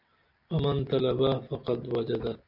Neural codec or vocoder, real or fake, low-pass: none; real; 5.4 kHz